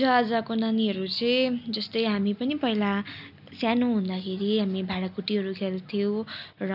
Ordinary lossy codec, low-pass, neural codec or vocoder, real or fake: none; 5.4 kHz; none; real